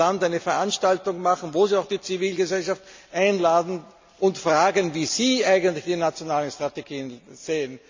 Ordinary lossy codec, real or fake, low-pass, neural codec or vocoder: MP3, 64 kbps; real; 7.2 kHz; none